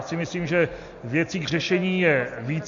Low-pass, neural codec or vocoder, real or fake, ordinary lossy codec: 7.2 kHz; none; real; MP3, 48 kbps